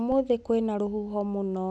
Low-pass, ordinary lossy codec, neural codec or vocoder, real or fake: none; none; none; real